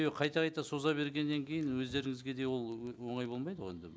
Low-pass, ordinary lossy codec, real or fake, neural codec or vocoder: none; none; real; none